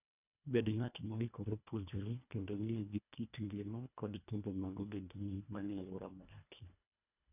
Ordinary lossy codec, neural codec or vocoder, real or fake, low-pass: none; codec, 24 kHz, 1.5 kbps, HILCodec; fake; 3.6 kHz